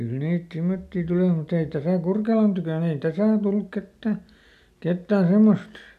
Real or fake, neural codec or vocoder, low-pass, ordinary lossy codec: real; none; 14.4 kHz; none